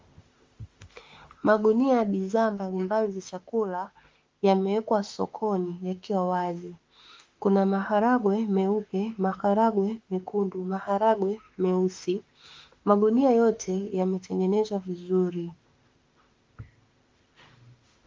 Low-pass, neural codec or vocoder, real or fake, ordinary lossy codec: 7.2 kHz; autoencoder, 48 kHz, 32 numbers a frame, DAC-VAE, trained on Japanese speech; fake; Opus, 32 kbps